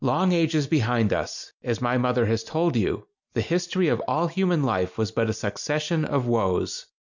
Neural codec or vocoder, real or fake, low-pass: none; real; 7.2 kHz